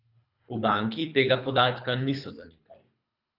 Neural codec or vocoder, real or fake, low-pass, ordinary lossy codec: codec, 24 kHz, 3 kbps, HILCodec; fake; 5.4 kHz; none